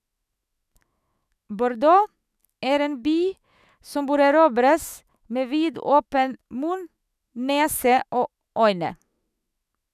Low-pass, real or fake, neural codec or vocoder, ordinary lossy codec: 14.4 kHz; fake; autoencoder, 48 kHz, 128 numbers a frame, DAC-VAE, trained on Japanese speech; none